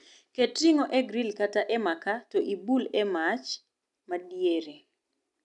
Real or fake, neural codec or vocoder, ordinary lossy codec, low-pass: real; none; none; 10.8 kHz